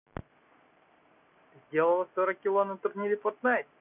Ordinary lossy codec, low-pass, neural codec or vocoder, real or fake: none; 3.6 kHz; none; real